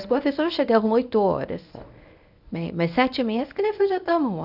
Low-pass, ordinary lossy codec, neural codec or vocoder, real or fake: 5.4 kHz; none; codec, 24 kHz, 0.9 kbps, WavTokenizer, medium speech release version 1; fake